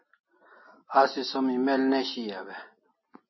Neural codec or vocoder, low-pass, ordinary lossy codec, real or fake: none; 7.2 kHz; MP3, 24 kbps; real